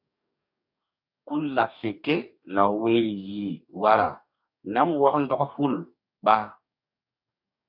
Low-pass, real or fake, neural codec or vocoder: 5.4 kHz; fake; codec, 44.1 kHz, 2.6 kbps, DAC